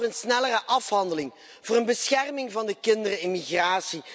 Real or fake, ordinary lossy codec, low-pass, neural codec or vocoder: real; none; none; none